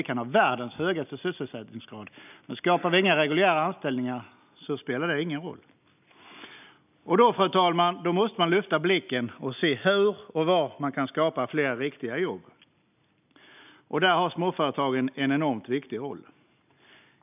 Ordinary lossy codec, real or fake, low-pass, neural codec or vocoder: none; real; 3.6 kHz; none